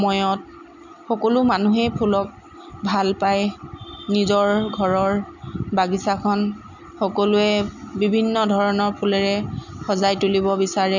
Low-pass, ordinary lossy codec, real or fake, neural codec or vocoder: 7.2 kHz; none; real; none